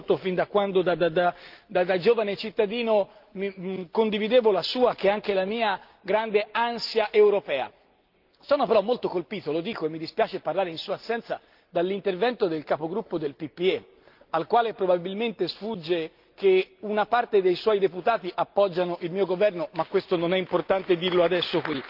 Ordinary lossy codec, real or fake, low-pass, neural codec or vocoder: Opus, 32 kbps; real; 5.4 kHz; none